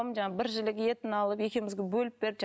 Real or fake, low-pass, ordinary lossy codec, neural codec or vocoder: real; none; none; none